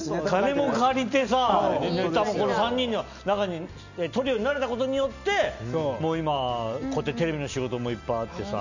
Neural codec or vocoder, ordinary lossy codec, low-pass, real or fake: none; none; 7.2 kHz; real